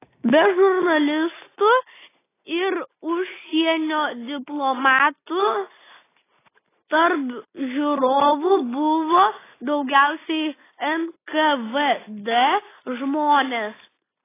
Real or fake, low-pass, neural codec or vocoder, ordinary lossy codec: real; 3.6 kHz; none; AAC, 16 kbps